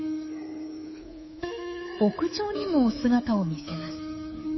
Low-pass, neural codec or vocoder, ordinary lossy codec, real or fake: 7.2 kHz; codec, 24 kHz, 3.1 kbps, DualCodec; MP3, 24 kbps; fake